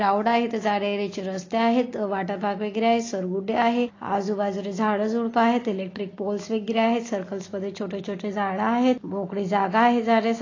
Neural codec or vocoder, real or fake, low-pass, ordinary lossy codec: none; real; 7.2 kHz; AAC, 32 kbps